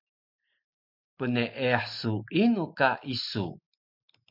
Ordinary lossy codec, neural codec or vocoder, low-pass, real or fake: MP3, 48 kbps; none; 5.4 kHz; real